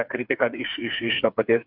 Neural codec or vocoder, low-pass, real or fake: codec, 16 kHz, 4 kbps, FreqCodec, smaller model; 5.4 kHz; fake